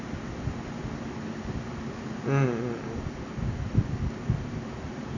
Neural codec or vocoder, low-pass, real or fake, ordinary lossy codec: none; 7.2 kHz; real; none